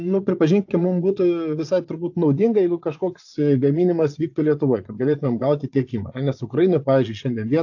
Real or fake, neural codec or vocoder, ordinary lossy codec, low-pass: fake; vocoder, 22.05 kHz, 80 mel bands, Vocos; AAC, 48 kbps; 7.2 kHz